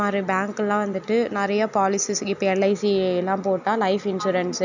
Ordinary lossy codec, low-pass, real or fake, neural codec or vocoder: none; 7.2 kHz; real; none